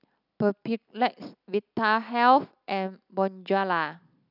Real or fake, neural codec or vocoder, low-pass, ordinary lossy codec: real; none; 5.4 kHz; none